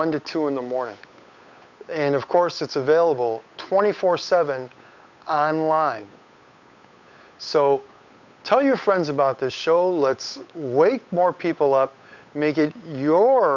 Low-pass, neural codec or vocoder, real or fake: 7.2 kHz; codec, 16 kHz, 8 kbps, FunCodec, trained on Chinese and English, 25 frames a second; fake